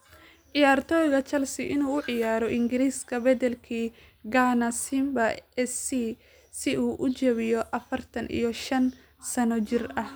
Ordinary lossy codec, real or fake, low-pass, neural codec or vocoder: none; real; none; none